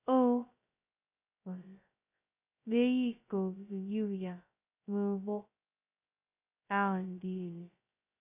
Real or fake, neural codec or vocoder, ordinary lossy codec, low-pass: fake; codec, 16 kHz, 0.2 kbps, FocalCodec; none; 3.6 kHz